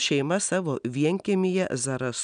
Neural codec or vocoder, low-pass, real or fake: none; 9.9 kHz; real